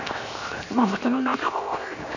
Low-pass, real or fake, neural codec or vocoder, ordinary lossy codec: 7.2 kHz; fake; codec, 16 kHz, 0.7 kbps, FocalCodec; none